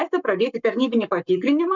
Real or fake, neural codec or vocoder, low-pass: fake; codec, 44.1 kHz, 7.8 kbps, Pupu-Codec; 7.2 kHz